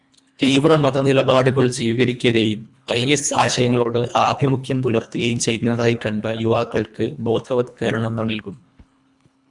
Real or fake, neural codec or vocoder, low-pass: fake; codec, 24 kHz, 1.5 kbps, HILCodec; 10.8 kHz